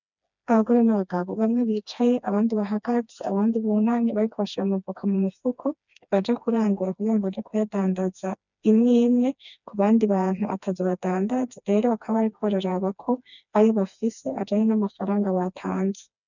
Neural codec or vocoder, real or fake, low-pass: codec, 16 kHz, 2 kbps, FreqCodec, smaller model; fake; 7.2 kHz